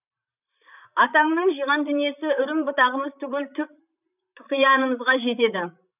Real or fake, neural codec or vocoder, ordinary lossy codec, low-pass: fake; vocoder, 44.1 kHz, 128 mel bands, Pupu-Vocoder; none; 3.6 kHz